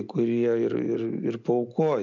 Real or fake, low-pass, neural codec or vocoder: real; 7.2 kHz; none